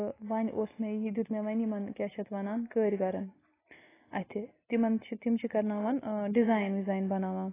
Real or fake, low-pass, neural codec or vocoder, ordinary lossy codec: real; 3.6 kHz; none; AAC, 16 kbps